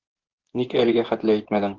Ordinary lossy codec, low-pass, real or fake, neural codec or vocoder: Opus, 16 kbps; 7.2 kHz; fake; vocoder, 24 kHz, 100 mel bands, Vocos